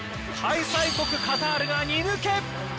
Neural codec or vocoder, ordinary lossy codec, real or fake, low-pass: none; none; real; none